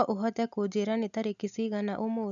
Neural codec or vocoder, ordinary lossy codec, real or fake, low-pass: none; none; real; 7.2 kHz